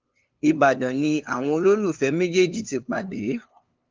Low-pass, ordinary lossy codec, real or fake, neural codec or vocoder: 7.2 kHz; Opus, 32 kbps; fake; codec, 16 kHz, 2 kbps, FunCodec, trained on LibriTTS, 25 frames a second